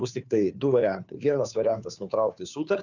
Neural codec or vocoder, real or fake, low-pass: codec, 16 kHz, 2 kbps, FunCodec, trained on Chinese and English, 25 frames a second; fake; 7.2 kHz